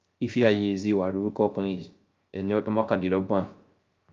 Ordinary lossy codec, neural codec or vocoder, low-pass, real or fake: Opus, 32 kbps; codec, 16 kHz, 0.3 kbps, FocalCodec; 7.2 kHz; fake